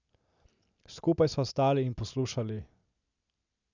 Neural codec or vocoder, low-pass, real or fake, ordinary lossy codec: none; 7.2 kHz; real; none